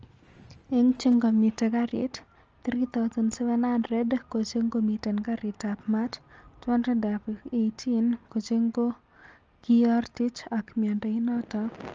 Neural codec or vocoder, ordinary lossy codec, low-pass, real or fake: none; Opus, 24 kbps; 7.2 kHz; real